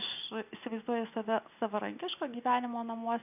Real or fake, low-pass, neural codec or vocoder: real; 3.6 kHz; none